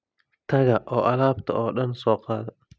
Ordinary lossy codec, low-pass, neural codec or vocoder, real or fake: none; none; none; real